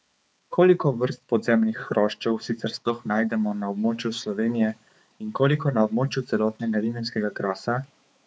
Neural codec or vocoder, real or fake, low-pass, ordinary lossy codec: codec, 16 kHz, 4 kbps, X-Codec, HuBERT features, trained on balanced general audio; fake; none; none